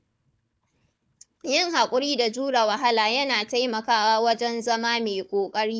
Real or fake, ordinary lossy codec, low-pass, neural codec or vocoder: fake; none; none; codec, 16 kHz, 4 kbps, FunCodec, trained on Chinese and English, 50 frames a second